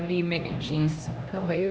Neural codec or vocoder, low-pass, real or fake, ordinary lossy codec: codec, 16 kHz, 1 kbps, X-Codec, HuBERT features, trained on LibriSpeech; none; fake; none